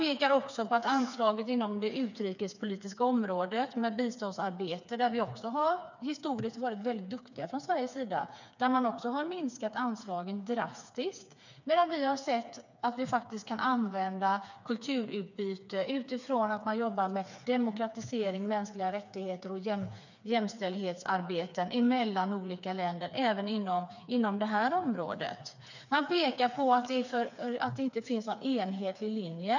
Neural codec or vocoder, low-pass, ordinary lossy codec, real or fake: codec, 16 kHz, 4 kbps, FreqCodec, smaller model; 7.2 kHz; none; fake